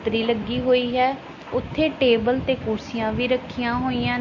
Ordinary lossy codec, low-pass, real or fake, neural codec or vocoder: MP3, 32 kbps; 7.2 kHz; real; none